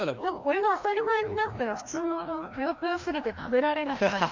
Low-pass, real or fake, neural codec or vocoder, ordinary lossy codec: 7.2 kHz; fake; codec, 16 kHz, 1 kbps, FreqCodec, larger model; MP3, 64 kbps